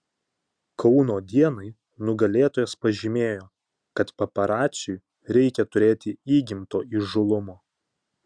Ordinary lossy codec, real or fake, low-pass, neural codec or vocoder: Opus, 64 kbps; real; 9.9 kHz; none